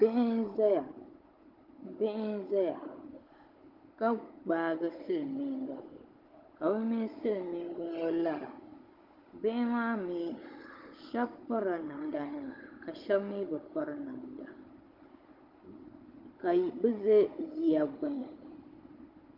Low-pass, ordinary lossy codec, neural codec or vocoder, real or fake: 5.4 kHz; Opus, 32 kbps; codec, 16 kHz, 16 kbps, FunCodec, trained on Chinese and English, 50 frames a second; fake